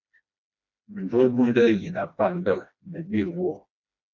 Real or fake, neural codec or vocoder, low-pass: fake; codec, 16 kHz, 1 kbps, FreqCodec, smaller model; 7.2 kHz